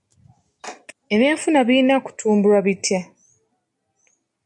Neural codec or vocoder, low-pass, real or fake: none; 10.8 kHz; real